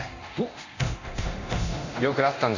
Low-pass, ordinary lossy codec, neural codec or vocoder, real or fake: 7.2 kHz; none; codec, 24 kHz, 0.9 kbps, DualCodec; fake